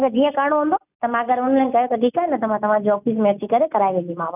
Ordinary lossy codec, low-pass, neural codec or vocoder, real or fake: none; 3.6 kHz; none; real